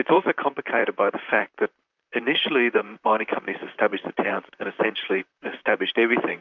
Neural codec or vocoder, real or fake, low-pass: vocoder, 44.1 kHz, 128 mel bands, Pupu-Vocoder; fake; 7.2 kHz